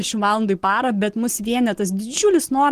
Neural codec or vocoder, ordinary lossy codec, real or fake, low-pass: none; Opus, 16 kbps; real; 14.4 kHz